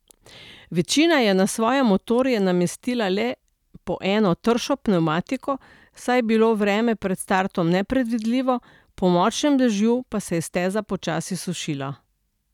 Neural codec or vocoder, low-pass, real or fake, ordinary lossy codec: none; 19.8 kHz; real; none